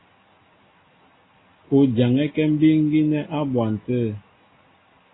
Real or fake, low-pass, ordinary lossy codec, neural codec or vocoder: real; 7.2 kHz; AAC, 16 kbps; none